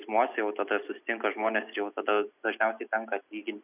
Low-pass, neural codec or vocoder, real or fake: 3.6 kHz; none; real